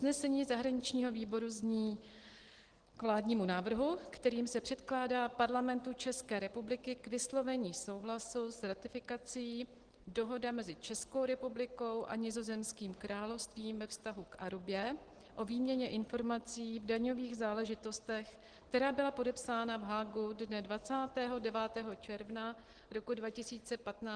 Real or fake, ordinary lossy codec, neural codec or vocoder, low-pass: real; Opus, 16 kbps; none; 9.9 kHz